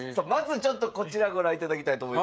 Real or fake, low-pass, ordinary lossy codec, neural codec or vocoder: fake; none; none; codec, 16 kHz, 16 kbps, FreqCodec, smaller model